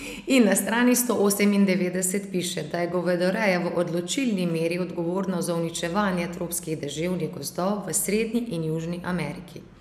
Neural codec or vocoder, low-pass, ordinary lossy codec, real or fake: none; 14.4 kHz; none; real